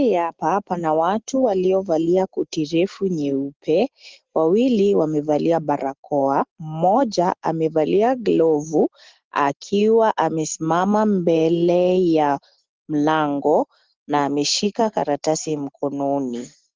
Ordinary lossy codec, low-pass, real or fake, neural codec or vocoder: Opus, 16 kbps; 7.2 kHz; real; none